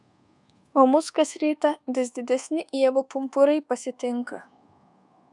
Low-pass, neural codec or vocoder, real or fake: 10.8 kHz; codec, 24 kHz, 1.2 kbps, DualCodec; fake